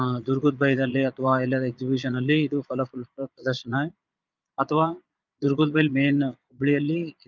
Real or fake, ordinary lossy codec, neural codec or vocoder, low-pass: fake; Opus, 24 kbps; vocoder, 22.05 kHz, 80 mel bands, Vocos; 7.2 kHz